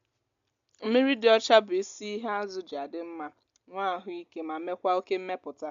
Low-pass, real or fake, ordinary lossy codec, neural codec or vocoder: 7.2 kHz; real; MP3, 64 kbps; none